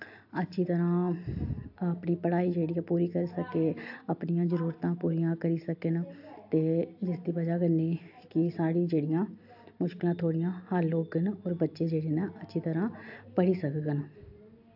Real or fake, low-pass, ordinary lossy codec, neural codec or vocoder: real; 5.4 kHz; AAC, 48 kbps; none